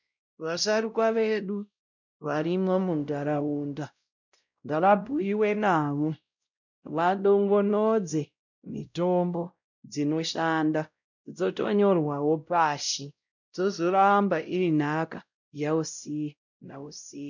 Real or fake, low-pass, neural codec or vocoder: fake; 7.2 kHz; codec, 16 kHz, 0.5 kbps, X-Codec, WavLM features, trained on Multilingual LibriSpeech